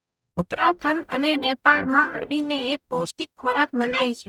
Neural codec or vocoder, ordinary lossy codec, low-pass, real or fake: codec, 44.1 kHz, 0.9 kbps, DAC; none; 19.8 kHz; fake